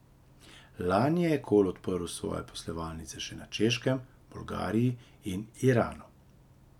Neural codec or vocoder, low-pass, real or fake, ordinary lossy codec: none; 19.8 kHz; real; none